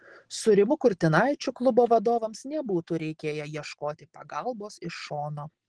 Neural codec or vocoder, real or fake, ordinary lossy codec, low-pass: none; real; Opus, 16 kbps; 9.9 kHz